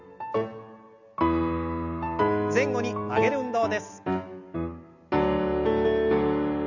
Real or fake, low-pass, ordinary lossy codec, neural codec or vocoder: real; 7.2 kHz; none; none